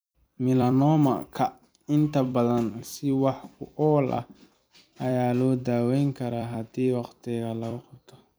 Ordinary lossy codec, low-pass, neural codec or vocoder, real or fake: none; none; vocoder, 44.1 kHz, 128 mel bands every 256 samples, BigVGAN v2; fake